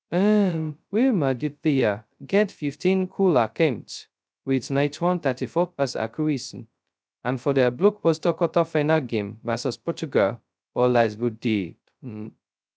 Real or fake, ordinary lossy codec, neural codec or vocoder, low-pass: fake; none; codec, 16 kHz, 0.2 kbps, FocalCodec; none